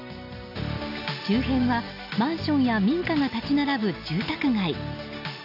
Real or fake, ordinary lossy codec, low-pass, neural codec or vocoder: real; none; 5.4 kHz; none